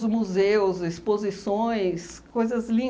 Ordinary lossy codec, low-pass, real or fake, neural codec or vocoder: none; none; real; none